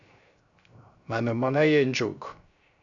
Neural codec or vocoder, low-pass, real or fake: codec, 16 kHz, 0.3 kbps, FocalCodec; 7.2 kHz; fake